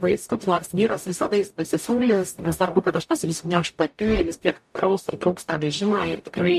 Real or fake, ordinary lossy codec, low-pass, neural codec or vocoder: fake; MP3, 64 kbps; 14.4 kHz; codec, 44.1 kHz, 0.9 kbps, DAC